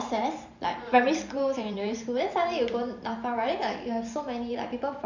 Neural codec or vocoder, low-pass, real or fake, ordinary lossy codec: vocoder, 44.1 kHz, 128 mel bands every 512 samples, BigVGAN v2; 7.2 kHz; fake; none